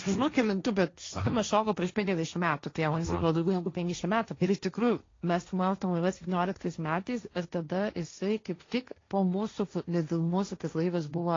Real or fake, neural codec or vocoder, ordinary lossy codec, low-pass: fake; codec, 16 kHz, 1.1 kbps, Voila-Tokenizer; AAC, 32 kbps; 7.2 kHz